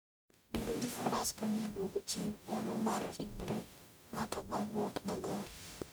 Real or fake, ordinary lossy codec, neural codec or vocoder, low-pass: fake; none; codec, 44.1 kHz, 0.9 kbps, DAC; none